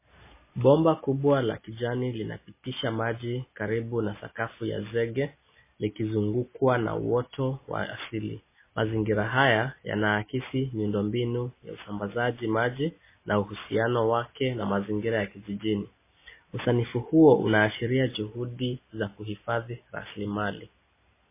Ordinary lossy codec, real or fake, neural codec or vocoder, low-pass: MP3, 16 kbps; real; none; 3.6 kHz